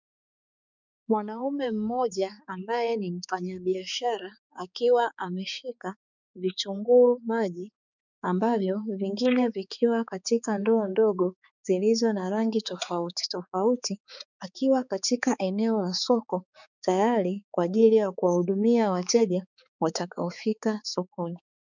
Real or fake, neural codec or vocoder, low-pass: fake; codec, 16 kHz, 4 kbps, X-Codec, HuBERT features, trained on balanced general audio; 7.2 kHz